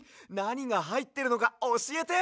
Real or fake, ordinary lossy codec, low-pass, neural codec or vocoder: real; none; none; none